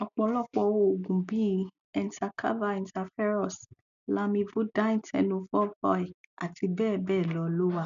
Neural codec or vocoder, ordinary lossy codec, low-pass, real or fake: none; none; 7.2 kHz; real